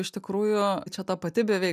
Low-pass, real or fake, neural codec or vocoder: 14.4 kHz; real; none